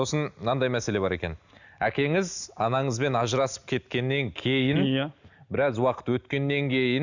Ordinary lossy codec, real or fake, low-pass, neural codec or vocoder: none; real; 7.2 kHz; none